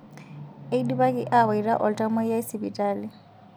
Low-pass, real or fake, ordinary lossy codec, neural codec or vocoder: none; real; none; none